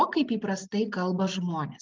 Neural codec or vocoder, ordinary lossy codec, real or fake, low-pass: none; Opus, 24 kbps; real; 7.2 kHz